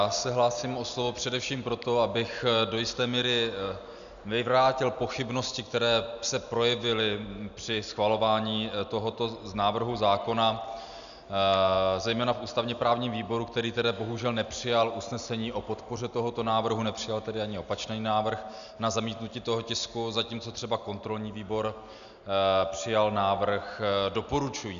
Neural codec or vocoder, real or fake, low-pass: none; real; 7.2 kHz